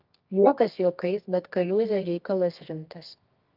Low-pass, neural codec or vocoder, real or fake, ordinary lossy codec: 5.4 kHz; codec, 24 kHz, 0.9 kbps, WavTokenizer, medium music audio release; fake; Opus, 24 kbps